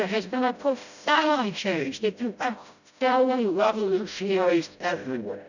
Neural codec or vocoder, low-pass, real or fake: codec, 16 kHz, 0.5 kbps, FreqCodec, smaller model; 7.2 kHz; fake